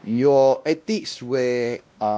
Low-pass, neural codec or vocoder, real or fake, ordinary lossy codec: none; codec, 16 kHz, 1 kbps, X-Codec, WavLM features, trained on Multilingual LibriSpeech; fake; none